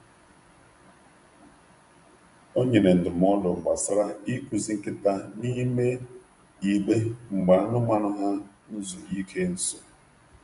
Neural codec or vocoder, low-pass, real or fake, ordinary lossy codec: vocoder, 24 kHz, 100 mel bands, Vocos; 10.8 kHz; fake; none